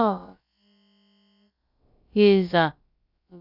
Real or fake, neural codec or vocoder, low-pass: fake; codec, 16 kHz, about 1 kbps, DyCAST, with the encoder's durations; 5.4 kHz